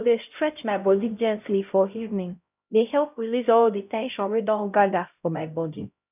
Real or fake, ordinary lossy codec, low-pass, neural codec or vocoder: fake; none; 3.6 kHz; codec, 16 kHz, 0.5 kbps, X-Codec, HuBERT features, trained on LibriSpeech